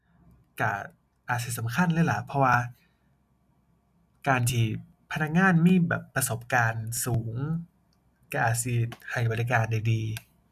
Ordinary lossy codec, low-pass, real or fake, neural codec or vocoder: none; 14.4 kHz; real; none